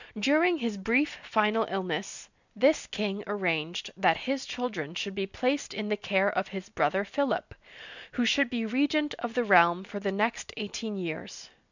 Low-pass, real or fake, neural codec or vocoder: 7.2 kHz; real; none